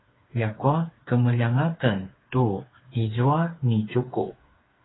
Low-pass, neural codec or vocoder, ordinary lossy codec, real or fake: 7.2 kHz; codec, 16 kHz, 4 kbps, FreqCodec, smaller model; AAC, 16 kbps; fake